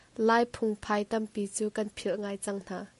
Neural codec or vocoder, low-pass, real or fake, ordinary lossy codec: none; 14.4 kHz; real; MP3, 48 kbps